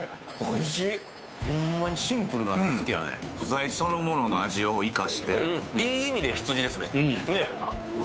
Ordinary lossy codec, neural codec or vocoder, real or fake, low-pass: none; codec, 16 kHz, 2 kbps, FunCodec, trained on Chinese and English, 25 frames a second; fake; none